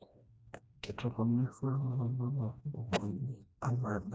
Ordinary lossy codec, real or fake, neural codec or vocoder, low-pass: none; fake; codec, 16 kHz, 1 kbps, FreqCodec, smaller model; none